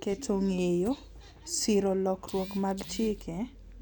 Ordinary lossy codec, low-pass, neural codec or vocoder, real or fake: none; 19.8 kHz; none; real